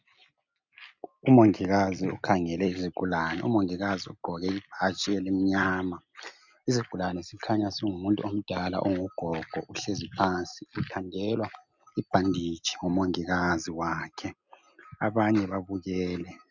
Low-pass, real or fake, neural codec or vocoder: 7.2 kHz; real; none